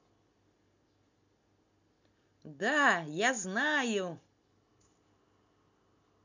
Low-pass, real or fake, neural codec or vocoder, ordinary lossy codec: 7.2 kHz; real; none; none